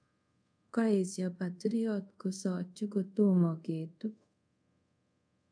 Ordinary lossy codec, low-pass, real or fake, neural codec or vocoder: none; 9.9 kHz; fake; codec, 24 kHz, 0.5 kbps, DualCodec